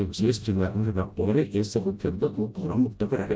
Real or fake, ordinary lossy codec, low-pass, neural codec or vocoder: fake; none; none; codec, 16 kHz, 0.5 kbps, FreqCodec, smaller model